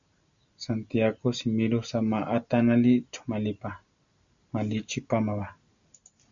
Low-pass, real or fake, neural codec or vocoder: 7.2 kHz; real; none